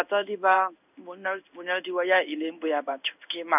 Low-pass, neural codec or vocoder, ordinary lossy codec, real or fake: 3.6 kHz; codec, 16 kHz in and 24 kHz out, 1 kbps, XY-Tokenizer; none; fake